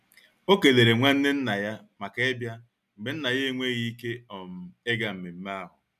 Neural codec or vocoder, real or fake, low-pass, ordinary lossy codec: none; real; 14.4 kHz; none